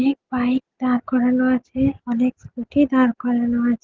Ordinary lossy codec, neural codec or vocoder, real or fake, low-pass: Opus, 16 kbps; none; real; 7.2 kHz